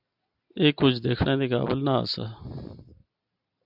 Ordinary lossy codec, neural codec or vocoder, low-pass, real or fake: AAC, 48 kbps; none; 5.4 kHz; real